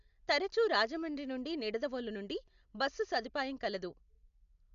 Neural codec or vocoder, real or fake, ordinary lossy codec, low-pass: none; real; none; 7.2 kHz